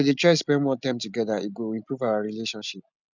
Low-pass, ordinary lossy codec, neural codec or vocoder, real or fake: 7.2 kHz; none; none; real